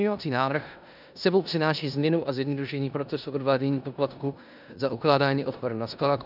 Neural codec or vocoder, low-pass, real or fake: codec, 16 kHz in and 24 kHz out, 0.9 kbps, LongCat-Audio-Codec, four codebook decoder; 5.4 kHz; fake